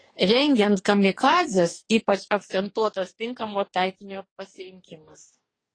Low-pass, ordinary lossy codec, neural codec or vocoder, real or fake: 9.9 kHz; AAC, 32 kbps; codec, 44.1 kHz, 2.6 kbps, DAC; fake